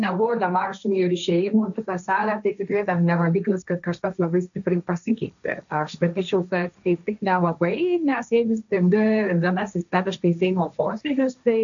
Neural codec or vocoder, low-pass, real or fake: codec, 16 kHz, 1.1 kbps, Voila-Tokenizer; 7.2 kHz; fake